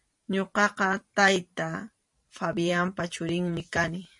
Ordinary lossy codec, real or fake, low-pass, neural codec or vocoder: MP3, 48 kbps; fake; 10.8 kHz; vocoder, 44.1 kHz, 128 mel bands every 512 samples, BigVGAN v2